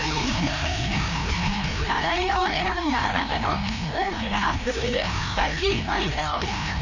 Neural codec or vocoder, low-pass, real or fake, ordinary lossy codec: codec, 16 kHz, 1 kbps, FreqCodec, larger model; 7.2 kHz; fake; none